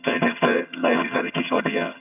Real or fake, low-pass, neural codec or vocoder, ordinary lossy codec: fake; 3.6 kHz; vocoder, 22.05 kHz, 80 mel bands, HiFi-GAN; none